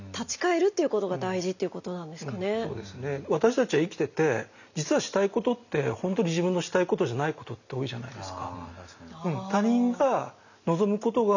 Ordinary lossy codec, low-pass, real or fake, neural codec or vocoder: none; 7.2 kHz; real; none